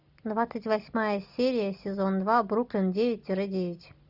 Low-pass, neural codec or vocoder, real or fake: 5.4 kHz; none; real